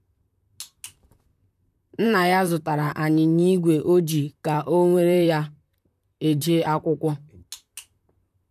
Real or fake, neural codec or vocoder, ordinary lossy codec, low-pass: fake; vocoder, 44.1 kHz, 128 mel bands, Pupu-Vocoder; none; 14.4 kHz